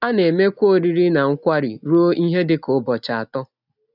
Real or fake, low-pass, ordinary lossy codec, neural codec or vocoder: real; 5.4 kHz; none; none